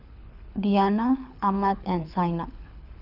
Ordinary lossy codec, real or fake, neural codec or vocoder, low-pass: none; fake; codec, 24 kHz, 6 kbps, HILCodec; 5.4 kHz